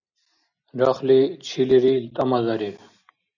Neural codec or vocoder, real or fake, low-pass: none; real; 7.2 kHz